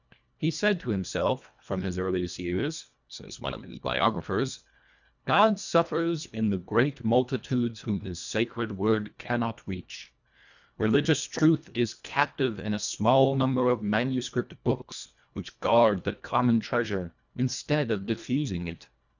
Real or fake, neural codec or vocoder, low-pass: fake; codec, 24 kHz, 1.5 kbps, HILCodec; 7.2 kHz